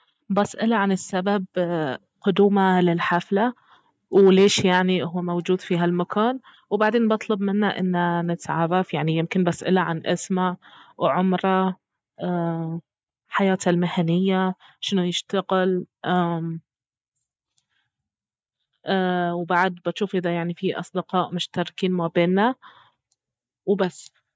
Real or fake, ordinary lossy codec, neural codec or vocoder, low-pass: real; none; none; none